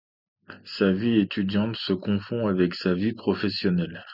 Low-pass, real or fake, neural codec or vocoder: 5.4 kHz; real; none